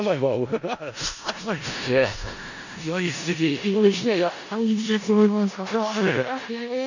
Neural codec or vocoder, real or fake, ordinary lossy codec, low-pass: codec, 16 kHz in and 24 kHz out, 0.4 kbps, LongCat-Audio-Codec, four codebook decoder; fake; none; 7.2 kHz